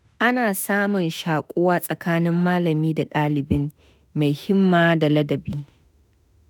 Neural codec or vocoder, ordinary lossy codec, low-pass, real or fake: autoencoder, 48 kHz, 32 numbers a frame, DAC-VAE, trained on Japanese speech; none; none; fake